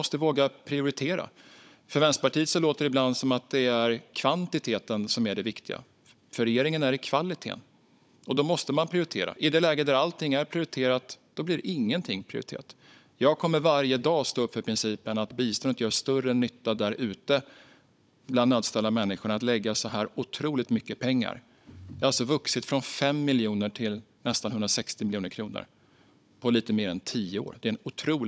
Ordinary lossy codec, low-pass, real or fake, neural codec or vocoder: none; none; fake; codec, 16 kHz, 16 kbps, FunCodec, trained on Chinese and English, 50 frames a second